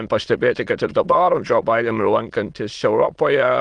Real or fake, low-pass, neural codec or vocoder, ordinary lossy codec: fake; 9.9 kHz; autoencoder, 22.05 kHz, a latent of 192 numbers a frame, VITS, trained on many speakers; Opus, 24 kbps